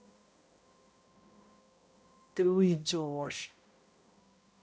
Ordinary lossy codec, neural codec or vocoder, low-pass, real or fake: none; codec, 16 kHz, 0.5 kbps, X-Codec, HuBERT features, trained on balanced general audio; none; fake